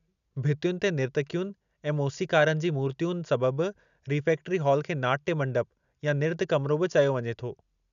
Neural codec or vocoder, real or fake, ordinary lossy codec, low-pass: none; real; none; 7.2 kHz